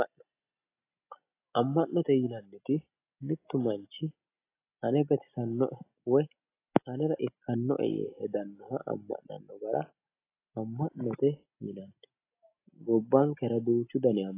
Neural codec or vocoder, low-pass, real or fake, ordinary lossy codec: none; 3.6 kHz; real; AAC, 24 kbps